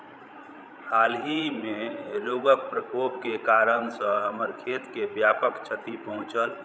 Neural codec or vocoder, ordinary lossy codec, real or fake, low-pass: codec, 16 kHz, 16 kbps, FreqCodec, larger model; none; fake; none